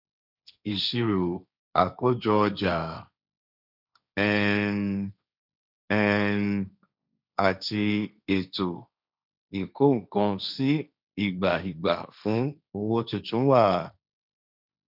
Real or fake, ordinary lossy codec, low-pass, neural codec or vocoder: fake; none; 5.4 kHz; codec, 16 kHz, 1.1 kbps, Voila-Tokenizer